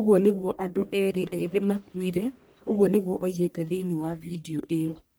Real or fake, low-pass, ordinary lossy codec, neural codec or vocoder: fake; none; none; codec, 44.1 kHz, 1.7 kbps, Pupu-Codec